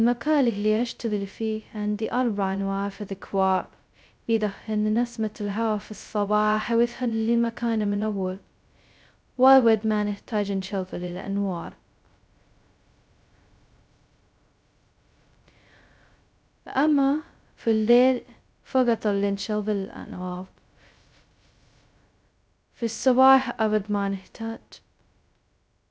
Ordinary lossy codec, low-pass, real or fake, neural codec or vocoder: none; none; fake; codec, 16 kHz, 0.2 kbps, FocalCodec